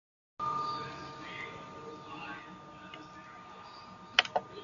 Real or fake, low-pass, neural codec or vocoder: real; 7.2 kHz; none